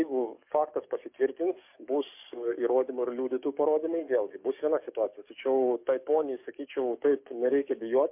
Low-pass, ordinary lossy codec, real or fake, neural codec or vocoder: 3.6 kHz; MP3, 32 kbps; fake; codec, 44.1 kHz, 7.8 kbps, DAC